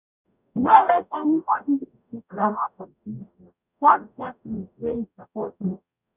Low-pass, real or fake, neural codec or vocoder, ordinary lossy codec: 3.6 kHz; fake; codec, 44.1 kHz, 0.9 kbps, DAC; none